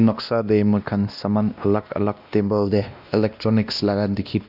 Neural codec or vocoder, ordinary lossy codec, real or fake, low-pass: codec, 16 kHz, 1 kbps, X-Codec, WavLM features, trained on Multilingual LibriSpeech; none; fake; 5.4 kHz